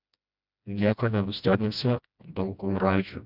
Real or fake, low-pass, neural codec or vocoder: fake; 5.4 kHz; codec, 16 kHz, 1 kbps, FreqCodec, smaller model